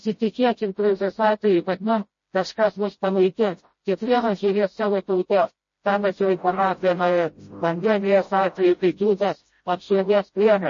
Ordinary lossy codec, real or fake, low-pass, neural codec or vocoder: MP3, 32 kbps; fake; 7.2 kHz; codec, 16 kHz, 0.5 kbps, FreqCodec, smaller model